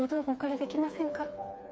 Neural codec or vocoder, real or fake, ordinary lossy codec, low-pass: codec, 16 kHz, 4 kbps, FreqCodec, smaller model; fake; none; none